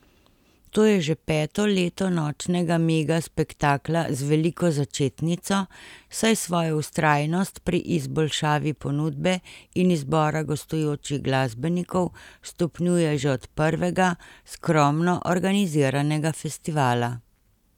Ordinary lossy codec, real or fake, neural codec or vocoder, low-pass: none; real; none; 19.8 kHz